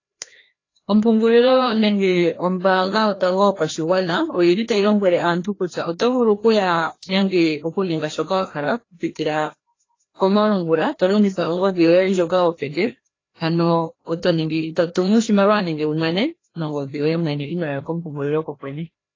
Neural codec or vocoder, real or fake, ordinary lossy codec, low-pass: codec, 16 kHz, 1 kbps, FreqCodec, larger model; fake; AAC, 32 kbps; 7.2 kHz